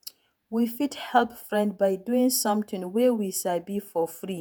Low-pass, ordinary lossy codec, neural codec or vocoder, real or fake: none; none; vocoder, 48 kHz, 128 mel bands, Vocos; fake